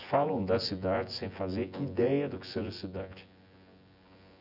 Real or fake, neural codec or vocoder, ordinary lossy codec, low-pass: fake; vocoder, 24 kHz, 100 mel bands, Vocos; none; 5.4 kHz